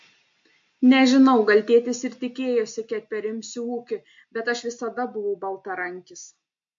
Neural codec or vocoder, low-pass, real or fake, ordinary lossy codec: none; 7.2 kHz; real; MP3, 48 kbps